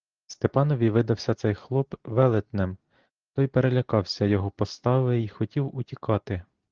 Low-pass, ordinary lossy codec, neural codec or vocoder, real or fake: 7.2 kHz; Opus, 16 kbps; none; real